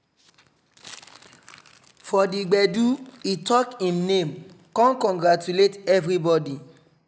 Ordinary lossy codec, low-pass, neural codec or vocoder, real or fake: none; none; none; real